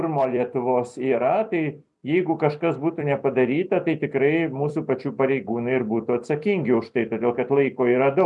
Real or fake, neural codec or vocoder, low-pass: fake; vocoder, 44.1 kHz, 128 mel bands every 256 samples, BigVGAN v2; 10.8 kHz